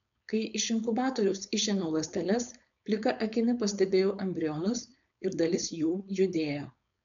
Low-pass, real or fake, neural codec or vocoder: 7.2 kHz; fake; codec, 16 kHz, 4.8 kbps, FACodec